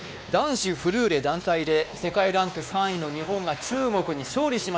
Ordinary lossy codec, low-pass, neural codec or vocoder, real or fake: none; none; codec, 16 kHz, 2 kbps, X-Codec, WavLM features, trained on Multilingual LibriSpeech; fake